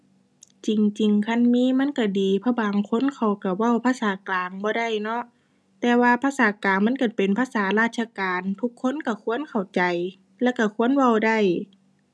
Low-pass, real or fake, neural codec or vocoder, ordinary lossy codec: none; real; none; none